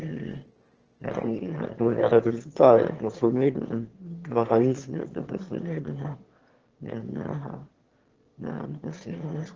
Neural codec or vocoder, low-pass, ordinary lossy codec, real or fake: autoencoder, 22.05 kHz, a latent of 192 numbers a frame, VITS, trained on one speaker; 7.2 kHz; Opus, 16 kbps; fake